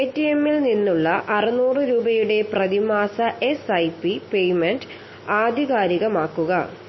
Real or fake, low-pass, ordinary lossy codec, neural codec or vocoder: real; 7.2 kHz; MP3, 24 kbps; none